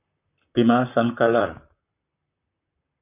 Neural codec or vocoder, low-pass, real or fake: vocoder, 44.1 kHz, 128 mel bands, Pupu-Vocoder; 3.6 kHz; fake